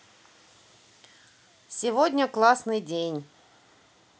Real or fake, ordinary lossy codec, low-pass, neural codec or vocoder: real; none; none; none